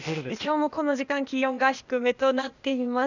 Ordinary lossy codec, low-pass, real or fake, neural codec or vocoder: none; 7.2 kHz; fake; codec, 16 kHz, 0.8 kbps, ZipCodec